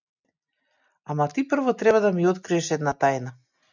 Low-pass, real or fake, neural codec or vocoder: 7.2 kHz; real; none